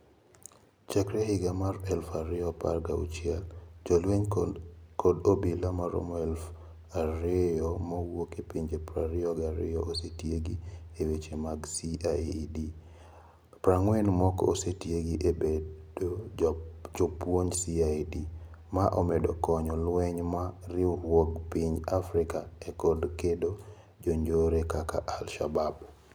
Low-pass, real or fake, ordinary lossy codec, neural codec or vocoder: none; real; none; none